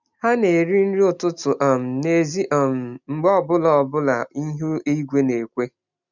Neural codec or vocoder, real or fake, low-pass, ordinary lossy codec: none; real; 7.2 kHz; none